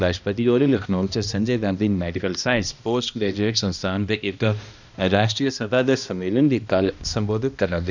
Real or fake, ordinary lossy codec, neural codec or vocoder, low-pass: fake; none; codec, 16 kHz, 1 kbps, X-Codec, HuBERT features, trained on balanced general audio; 7.2 kHz